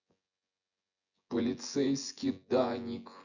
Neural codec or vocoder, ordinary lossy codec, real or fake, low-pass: vocoder, 24 kHz, 100 mel bands, Vocos; none; fake; 7.2 kHz